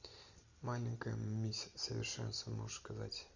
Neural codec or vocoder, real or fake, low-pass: none; real; 7.2 kHz